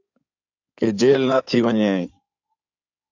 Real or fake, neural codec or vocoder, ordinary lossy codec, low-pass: fake; codec, 16 kHz in and 24 kHz out, 2.2 kbps, FireRedTTS-2 codec; AAC, 48 kbps; 7.2 kHz